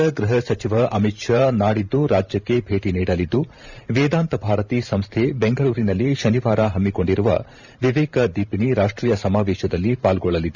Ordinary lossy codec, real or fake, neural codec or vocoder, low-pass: none; fake; vocoder, 44.1 kHz, 128 mel bands every 512 samples, BigVGAN v2; 7.2 kHz